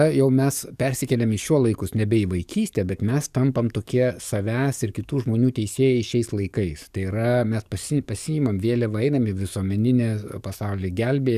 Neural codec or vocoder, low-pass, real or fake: codec, 44.1 kHz, 7.8 kbps, DAC; 14.4 kHz; fake